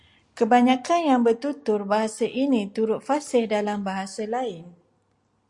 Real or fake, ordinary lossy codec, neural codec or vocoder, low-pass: real; Opus, 64 kbps; none; 10.8 kHz